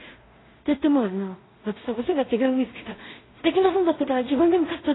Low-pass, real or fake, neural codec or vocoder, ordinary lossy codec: 7.2 kHz; fake; codec, 16 kHz in and 24 kHz out, 0.4 kbps, LongCat-Audio-Codec, two codebook decoder; AAC, 16 kbps